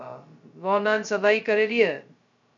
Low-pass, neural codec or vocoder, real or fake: 7.2 kHz; codec, 16 kHz, 0.2 kbps, FocalCodec; fake